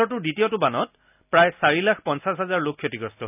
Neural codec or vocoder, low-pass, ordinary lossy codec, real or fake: none; 3.6 kHz; none; real